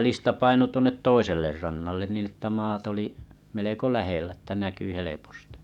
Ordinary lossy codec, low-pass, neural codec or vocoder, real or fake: none; 19.8 kHz; codec, 44.1 kHz, 7.8 kbps, DAC; fake